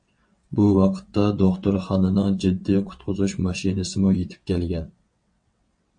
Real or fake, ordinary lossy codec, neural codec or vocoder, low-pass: fake; MP3, 48 kbps; vocoder, 22.05 kHz, 80 mel bands, WaveNeXt; 9.9 kHz